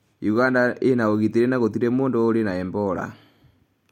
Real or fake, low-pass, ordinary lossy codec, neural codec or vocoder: real; 19.8 kHz; MP3, 64 kbps; none